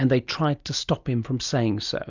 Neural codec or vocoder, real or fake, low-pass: none; real; 7.2 kHz